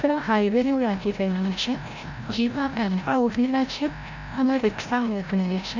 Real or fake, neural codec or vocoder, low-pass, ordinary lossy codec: fake; codec, 16 kHz, 0.5 kbps, FreqCodec, larger model; 7.2 kHz; none